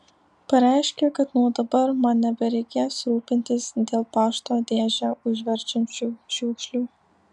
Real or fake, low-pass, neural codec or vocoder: real; 10.8 kHz; none